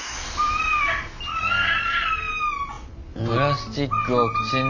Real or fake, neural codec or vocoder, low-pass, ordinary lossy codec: real; none; 7.2 kHz; none